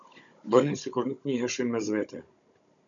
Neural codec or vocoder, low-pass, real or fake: codec, 16 kHz, 16 kbps, FunCodec, trained on Chinese and English, 50 frames a second; 7.2 kHz; fake